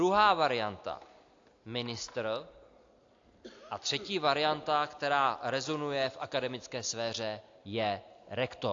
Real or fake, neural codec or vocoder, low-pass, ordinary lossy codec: real; none; 7.2 kHz; AAC, 48 kbps